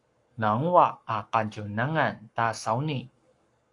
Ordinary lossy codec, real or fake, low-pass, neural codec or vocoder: AAC, 64 kbps; fake; 10.8 kHz; codec, 44.1 kHz, 7.8 kbps, Pupu-Codec